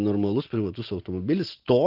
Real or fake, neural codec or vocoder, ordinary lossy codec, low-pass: real; none; Opus, 16 kbps; 5.4 kHz